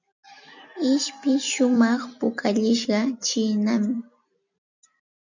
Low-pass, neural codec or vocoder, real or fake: 7.2 kHz; vocoder, 44.1 kHz, 128 mel bands every 256 samples, BigVGAN v2; fake